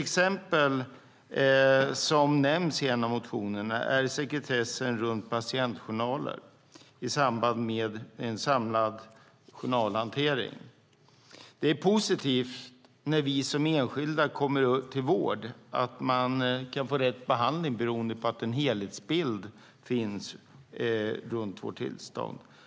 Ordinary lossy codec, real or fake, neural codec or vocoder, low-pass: none; real; none; none